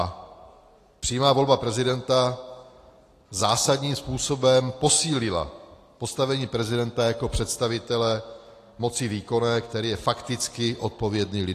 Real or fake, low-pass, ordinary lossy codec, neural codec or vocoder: real; 14.4 kHz; AAC, 48 kbps; none